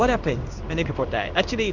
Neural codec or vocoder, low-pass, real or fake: codec, 16 kHz in and 24 kHz out, 1 kbps, XY-Tokenizer; 7.2 kHz; fake